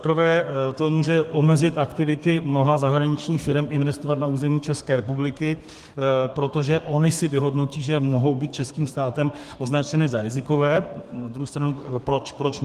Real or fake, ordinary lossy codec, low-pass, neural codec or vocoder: fake; Opus, 24 kbps; 14.4 kHz; codec, 32 kHz, 1.9 kbps, SNAC